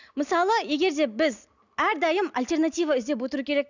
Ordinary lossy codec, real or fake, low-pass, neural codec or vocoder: none; real; 7.2 kHz; none